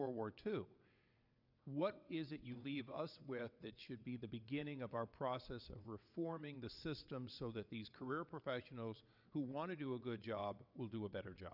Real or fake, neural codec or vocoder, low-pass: fake; vocoder, 22.05 kHz, 80 mel bands, WaveNeXt; 5.4 kHz